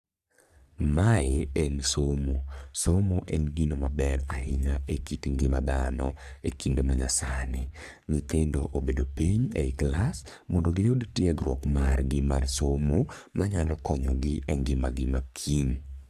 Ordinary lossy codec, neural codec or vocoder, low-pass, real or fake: none; codec, 44.1 kHz, 3.4 kbps, Pupu-Codec; 14.4 kHz; fake